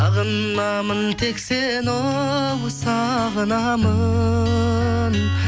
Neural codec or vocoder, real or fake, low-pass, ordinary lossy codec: none; real; none; none